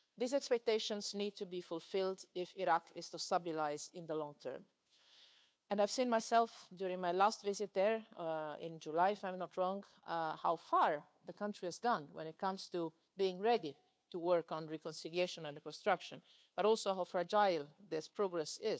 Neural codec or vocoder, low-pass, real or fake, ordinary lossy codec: codec, 16 kHz, 2 kbps, FunCodec, trained on Chinese and English, 25 frames a second; none; fake; none